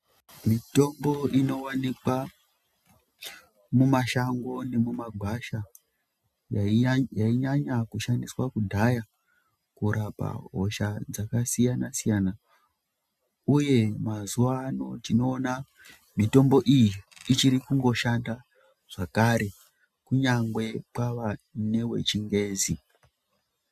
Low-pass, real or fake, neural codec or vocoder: 14.4 kHz; real; none